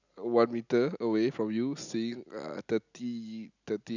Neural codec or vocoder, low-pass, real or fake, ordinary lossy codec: none; 7.2 kHz; real; none